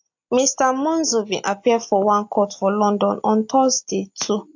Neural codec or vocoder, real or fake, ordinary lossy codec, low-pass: none; real; AAC, 48 kbps; 7.2 kHz